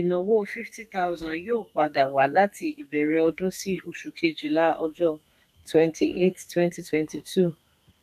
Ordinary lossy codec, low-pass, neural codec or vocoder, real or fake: none; 14.4 kHz; codec, 32 kHz, 1.9 kbps, SNAC; fake